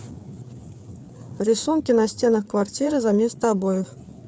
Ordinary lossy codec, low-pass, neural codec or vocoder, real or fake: none; none; codec, 16 kHz, 4 kbps, FreqCodec, larger model; fake